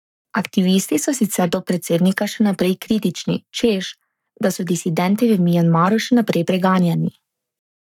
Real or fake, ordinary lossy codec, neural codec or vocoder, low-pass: fake; none; codec, 44.1 kHz, 7.8 kbps, Pupu-Codec; 19.8 kHz